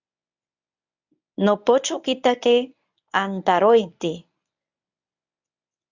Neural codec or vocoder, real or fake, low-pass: codec, 24 kHz, 0.9 kbps, WavTokenizer, medium speech release version 1; fake; 7.2 kHz